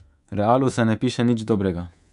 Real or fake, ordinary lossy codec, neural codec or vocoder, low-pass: fake; none; codec, 24 kHz, 3.1 kbps, DualCodec; 10.8 kHz